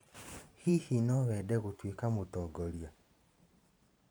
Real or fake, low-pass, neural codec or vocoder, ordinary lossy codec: fake; none; vocoder, 44.1 kHz, 128 mel bands every 512 samples, BigVGAN v2; none